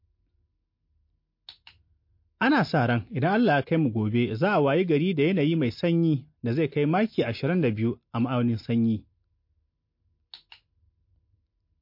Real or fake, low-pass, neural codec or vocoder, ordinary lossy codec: real; 5.4 kHz; none; MP3, 32 kbps